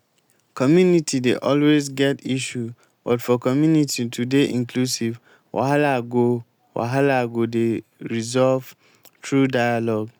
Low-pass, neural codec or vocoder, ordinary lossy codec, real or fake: none; none; none; real